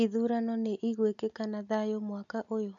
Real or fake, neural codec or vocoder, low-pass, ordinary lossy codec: real; none; 7.2 kHz; none